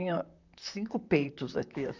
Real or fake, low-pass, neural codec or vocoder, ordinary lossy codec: fake; 7.2 kHz; vocoder, 44.1 kHz, 128 mel bands, Pupu-Vocoder; none